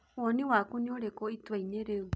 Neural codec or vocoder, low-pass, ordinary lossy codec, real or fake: none; none; none; real